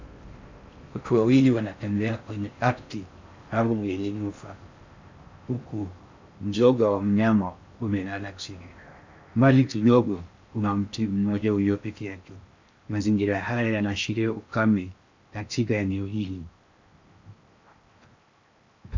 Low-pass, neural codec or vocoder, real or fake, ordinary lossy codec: 7.2 kHz; codec, 16 kHz in and 24 kHz out, 0.6 kbps, FocalCodec, streaming, 4096 codes; fake; MP3, 64 kbps